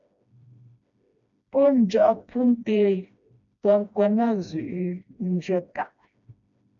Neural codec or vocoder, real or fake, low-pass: codec, 16 kHz, 1 kbps, FreqCodec, smaller model; fake; 7.2 kHz